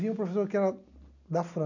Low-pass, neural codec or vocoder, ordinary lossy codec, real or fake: 7.2 kHz; none; none; real